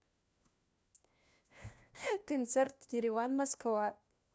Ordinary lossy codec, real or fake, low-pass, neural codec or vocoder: none; fake; none; codec, 16 kHz, 1 kbps, FunCodec, trained on LibriTTS, 50 frames a second